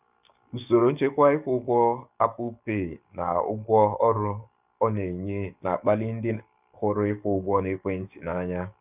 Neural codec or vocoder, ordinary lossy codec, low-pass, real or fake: codec, 44.1 kHz, 7.8 kbps, DAC; none; 3.6 kHz; fake